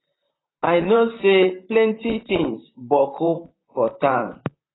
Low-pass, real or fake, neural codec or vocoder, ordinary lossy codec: 7.2 kHz; fake; vocoder, 44.1 kHz, 128 mel bands, Pupu-Vocoder; AAC, 16 kbps